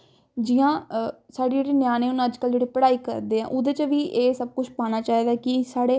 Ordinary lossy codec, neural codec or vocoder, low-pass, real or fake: none; none; none; real